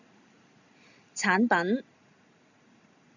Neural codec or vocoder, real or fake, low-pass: none; real; 7.2 kHz